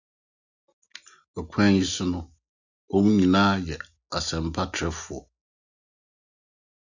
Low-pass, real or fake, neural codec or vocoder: 7.2 kHz; real; none